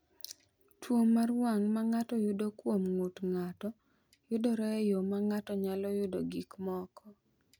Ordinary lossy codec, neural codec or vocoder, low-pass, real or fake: none; none; none; real